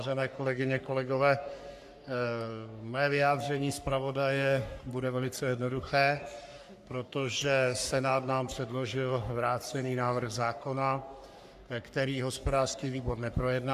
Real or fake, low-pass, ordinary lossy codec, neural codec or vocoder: fake; 14.4 kHz; AAC, 96 kbps; codec, 44.1 kHz, 3.4 kbps, Pupu-Codec